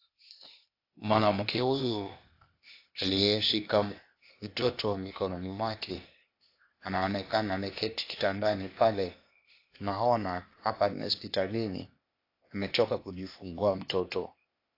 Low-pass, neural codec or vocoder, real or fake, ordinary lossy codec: 5.4 kHz; codec, 16 kHz, 0.8 kbps, ZipCodec; fake; AAC, 32 kbps